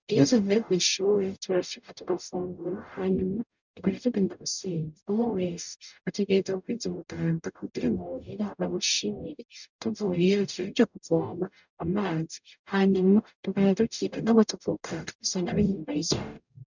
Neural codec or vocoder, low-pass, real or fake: codec, 44.1 kHz, 0.9 kbps, DAC; 7.2 kHz; fake